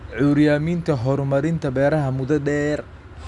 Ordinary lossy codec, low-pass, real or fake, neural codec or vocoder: none; 10.8 kHz; real; none